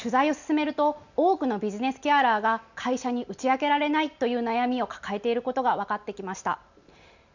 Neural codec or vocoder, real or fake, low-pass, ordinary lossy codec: none; real; 7.2 kHz; none